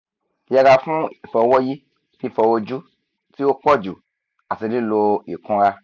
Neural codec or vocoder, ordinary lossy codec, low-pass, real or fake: none; none; 7.2 kHz; real